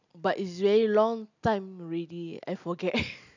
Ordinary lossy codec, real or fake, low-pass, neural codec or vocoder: none; real; 7.2 kHz; none